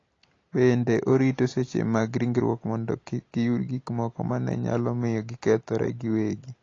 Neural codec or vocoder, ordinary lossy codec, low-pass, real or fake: none; AAC, 32 kbps; 7.2 kHz; real